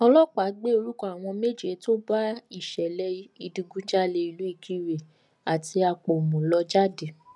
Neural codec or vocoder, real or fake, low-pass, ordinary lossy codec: none; real; 10.8 kHz; none